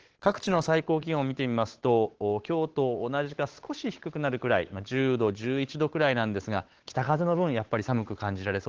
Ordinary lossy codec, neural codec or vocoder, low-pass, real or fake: Opus, 16 kbps; codec, 16 kHz, 8 kbps, FunCodec, trained on Chinese and English, 25 frames a second; 7.2 kHz; fake